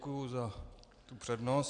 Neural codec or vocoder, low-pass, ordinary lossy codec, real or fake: none; 9.9 kHz; AAC, 64 kbps; real